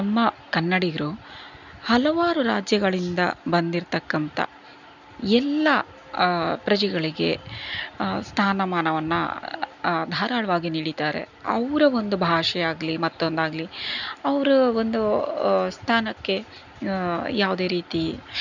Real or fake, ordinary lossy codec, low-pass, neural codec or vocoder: real; none; 7.2 kHz; none